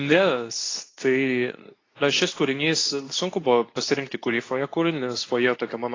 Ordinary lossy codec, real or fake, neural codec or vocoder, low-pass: AAC, 32 kbps; fake; codec, 24 kHz, 0.9 kbps, WavTokenizer, medium speech release version 2; 7.2 kHz